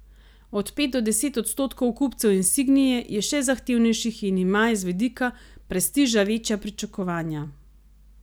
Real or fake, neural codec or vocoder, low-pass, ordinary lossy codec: real; none; none; none